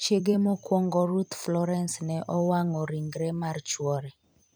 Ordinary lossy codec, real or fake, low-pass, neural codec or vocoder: none; fake; none; vocoder, 44.1 kHz, 128 mel bands every 256 samples, BigVGAN v2